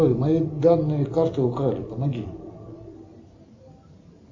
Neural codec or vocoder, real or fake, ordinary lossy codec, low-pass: none; real; MP3, 64 kbps; 7.2 kHz